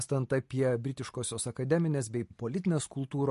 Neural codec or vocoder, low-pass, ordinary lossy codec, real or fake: none; 14.4 kHz; MP3, 48 kbps; real